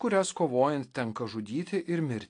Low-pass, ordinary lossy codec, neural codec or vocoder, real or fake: 9.9 kHz; AAC, 48 kbps; none; real